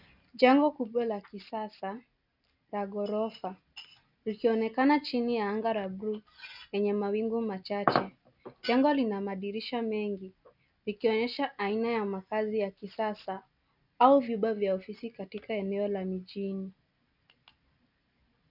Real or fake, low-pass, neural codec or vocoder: real; 5.4 kHz; none